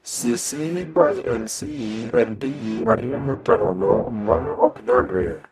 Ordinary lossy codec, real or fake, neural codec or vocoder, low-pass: none; fake; codec, 44.1 kHz, 0.9 kbps, DAC; 14.4 kHz